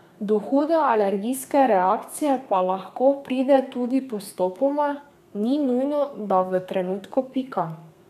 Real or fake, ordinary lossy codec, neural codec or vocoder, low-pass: fake; none; codec, 32 kHz, 1.9 kbps, SNAC; 14.4 kHz